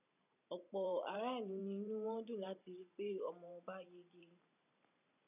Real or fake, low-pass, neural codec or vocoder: fake; 3.6 kHz; vocoder, 44.1 kHz, 128 mel bands every 512 samples, BigVGAN v2